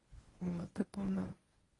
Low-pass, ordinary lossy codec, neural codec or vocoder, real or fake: 10.8 kHz; AAC, 32 kbps; codec, 24 kHz, 0.9 kbps, WavTokenizer, medium speech release version 1; fake